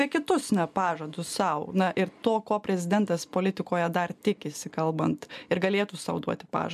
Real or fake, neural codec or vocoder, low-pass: real; none; 14.4 kHz